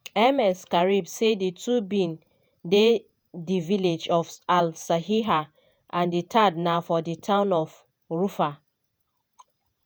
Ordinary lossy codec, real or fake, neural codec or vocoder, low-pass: none; fake; vocoder, 48 kHz, 128 mel bands, Vocos; none